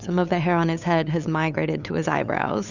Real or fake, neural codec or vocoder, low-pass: fake; codec, 16 kHz, 8 kbps, FunCodec, trained on LibriTTS, 25 frames a second; 7.2 kHz